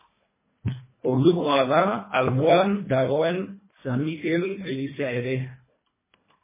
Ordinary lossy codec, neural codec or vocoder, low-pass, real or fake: MP3, 16 kbps; codec, 24 kHz, 1.5 kbps, HILCodec; 3.6 kHz; fake